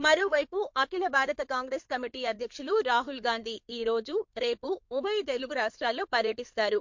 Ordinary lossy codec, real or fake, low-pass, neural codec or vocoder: MP3, 48 kbps; fake; 7.2 kHz; codec, 16 kHz in and 24 kHz out, 2.2 kbps, FireRedTTS-2 codec